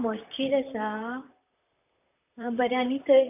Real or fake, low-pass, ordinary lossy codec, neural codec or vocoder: fake; 3.6 kHz; none; vocoder, 44.1 kHz, 128 mel bands, Pupu-Vocoder